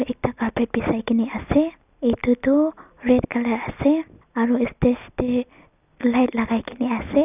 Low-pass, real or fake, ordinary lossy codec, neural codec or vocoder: 3.6 kHz; real; none; none